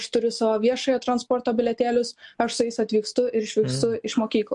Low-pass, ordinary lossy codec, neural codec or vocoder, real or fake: 10.8 kHz; MP3, 64 kbps; none; real